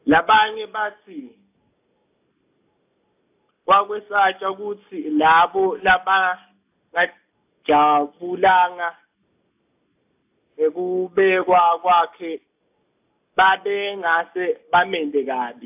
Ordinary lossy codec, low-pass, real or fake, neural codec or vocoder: AAC, 32 kbps; 3.6 kHz; real; none